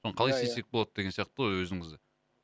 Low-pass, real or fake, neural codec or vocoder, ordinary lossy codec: none; real; none; none